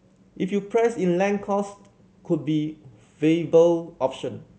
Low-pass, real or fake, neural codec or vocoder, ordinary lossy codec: none; real; none; none